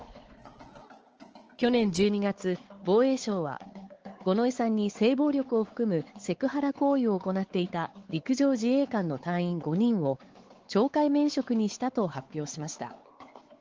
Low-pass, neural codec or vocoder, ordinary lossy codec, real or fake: 7.2 kHz; codec, 16 kHz, 4 kbps, X-Codec, WavLM features, trained on Multilingual LibriSpeech; Opus, 16 kbps; fake